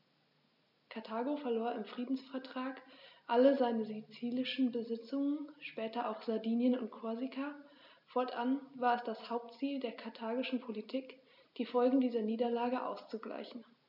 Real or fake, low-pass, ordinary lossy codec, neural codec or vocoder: real; 5.4 kHz; none; none